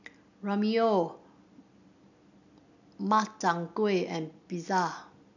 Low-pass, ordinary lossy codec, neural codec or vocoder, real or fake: 7.2 kHz; none; none; real